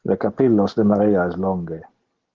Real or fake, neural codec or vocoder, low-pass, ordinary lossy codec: real; none; 7.2 kHz; Opus, 16 kbps